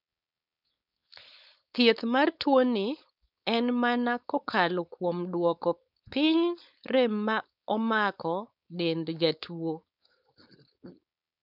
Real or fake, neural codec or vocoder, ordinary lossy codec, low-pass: fake; codec, 16 kHz, 4.8 kbps, FACodec; none; 5.4 kHz